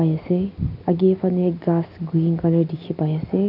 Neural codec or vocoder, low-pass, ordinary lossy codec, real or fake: none; 5.4 kHz; none; real